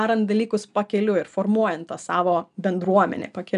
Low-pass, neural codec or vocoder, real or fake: 10.8 kHz; none; real